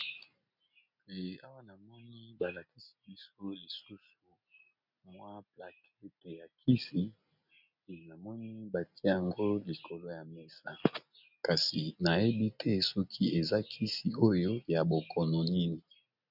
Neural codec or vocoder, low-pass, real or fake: none; 5.4 kHz; real